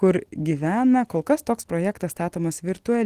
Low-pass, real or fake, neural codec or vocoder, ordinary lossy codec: 14.4 kHz; real; none; Opus, 16 kbps